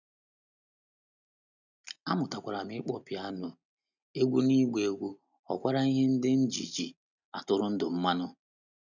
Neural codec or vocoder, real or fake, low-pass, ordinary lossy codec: none; real; 7.2 kHz; none